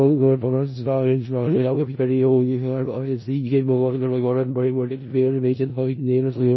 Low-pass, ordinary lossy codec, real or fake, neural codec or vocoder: 7.2 kHz; MP3, 24 kbps; fake; codec, 16 kHz in and 24 kHz out, 0.4 kbps, LongCat-Audio-Codec, four codebook decoder